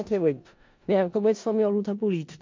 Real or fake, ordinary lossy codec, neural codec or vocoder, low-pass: fake; MP3, 48 kbps; codec, 16 kHz in and 24 kHz out, 0.4 kbps, LongCat-Audio-Codec, four codebook decoder; 7.2 kHz